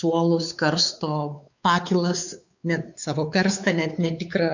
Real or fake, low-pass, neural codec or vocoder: fake; 7.2 kHz; codec, 16 kHz, 4 kbps, X-Codec, WavLM features, trained on Multilingual LibriSpeech